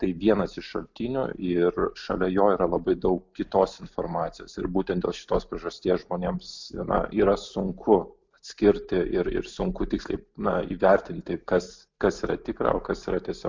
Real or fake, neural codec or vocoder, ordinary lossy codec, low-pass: real; none; MP3, 64 kbps; 7.2 kHz